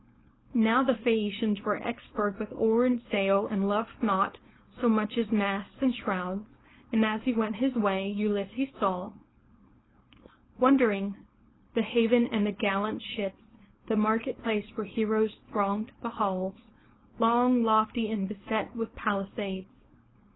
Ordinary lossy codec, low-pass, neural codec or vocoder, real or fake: AAC, 16 kbps; 7.2 kHz; codec, 16 kHz, 4.8 kbps, FACodec; fake